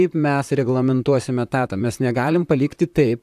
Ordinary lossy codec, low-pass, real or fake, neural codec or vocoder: AAC, 96 kbps; 14.4 kHz; fake; vocoder, 44.1 kHz, 128 mel bands, Pupu-Vocoder